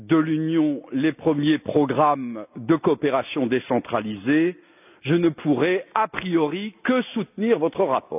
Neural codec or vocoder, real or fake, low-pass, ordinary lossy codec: none; real; 3.6 kHz; none